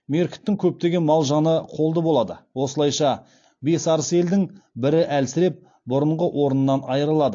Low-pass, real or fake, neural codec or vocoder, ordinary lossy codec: 7.2 kHz; real; none; AAC, 48 kbps